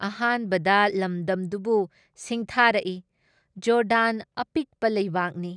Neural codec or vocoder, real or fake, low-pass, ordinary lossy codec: none; real; 9.9 kHz; none